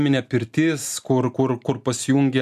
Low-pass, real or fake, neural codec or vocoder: 14.4 kHz; real; none